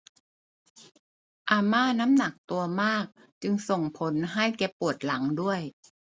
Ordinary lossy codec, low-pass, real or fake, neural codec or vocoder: none; none; real; none